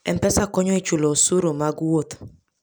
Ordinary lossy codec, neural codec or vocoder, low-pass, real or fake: none; none; none; real